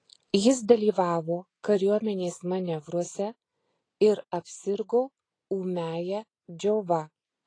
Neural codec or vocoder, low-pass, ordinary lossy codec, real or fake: none; 9.9 kHz; AAC, 32 kbps; real